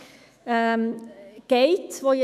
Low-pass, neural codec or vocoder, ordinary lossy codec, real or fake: 14.4 kHz; autoencoder, 48 kHz, 128 numbers a frame, DAC-VAE, trained on Japanese speech; none; fake